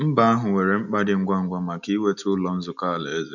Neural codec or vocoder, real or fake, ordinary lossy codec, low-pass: none; real; none; 7.2 kHz